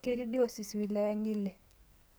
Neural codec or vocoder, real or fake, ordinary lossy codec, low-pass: codec, 44.1 kHz, 2.6 kbps, SNAC; fake; none; none